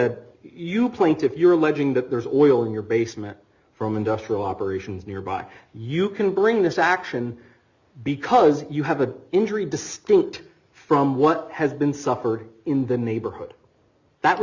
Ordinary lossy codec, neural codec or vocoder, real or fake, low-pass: Opus, 64 kbps; none; real; 7.2 kHz